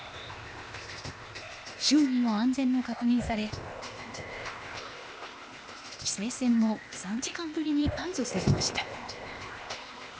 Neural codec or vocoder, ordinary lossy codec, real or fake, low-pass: codec, 16 kHz, 0.8 kbps, ZipCodec; none; fake; none